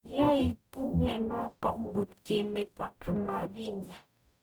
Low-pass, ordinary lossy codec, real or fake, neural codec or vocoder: none; none; fake; codec, 44.1 kHz, 0.9 kbps, DAC